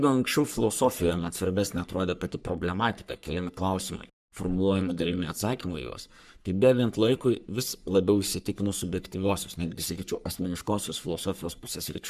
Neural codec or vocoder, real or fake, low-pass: codec, 44.1 kHz, 3.4 kbps, Pupu-Codec; fake; 14.4 kHz